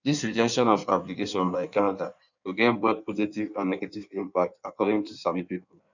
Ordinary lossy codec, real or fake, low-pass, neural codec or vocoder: none; fake; 7.2 kHz; codec, 16 kHz in and 24 kHz out, 1.1 kbps, FireRedTTS-2 codec